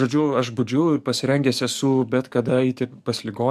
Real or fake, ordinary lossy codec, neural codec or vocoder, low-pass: fake; MP3, 96 kbps; codec, 44.1 kHz, 7.8 kbps, DAC; 14.4 kHz